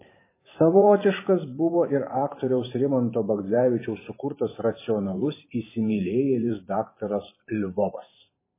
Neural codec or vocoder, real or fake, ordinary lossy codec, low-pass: vocoder, 44.1 kHz, 128 mel bands every 512 samples, BigVGAN v2; fake; MP3, 16 kbps; 3.6 kHz